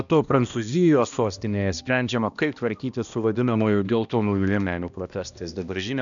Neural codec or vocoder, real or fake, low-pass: codec, 16 kHz, 1 kbps, X-Codec, HuBERT features, trained on balanced general audio; fake; 7.2 kHz